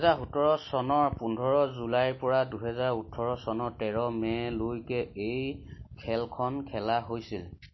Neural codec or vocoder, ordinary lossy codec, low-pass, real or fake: none; MP3, 24 kbps; 7.2 kHz; real